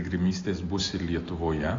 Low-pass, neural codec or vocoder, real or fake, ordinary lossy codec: 7.2 kHz; none; real; AAC, 32 kbps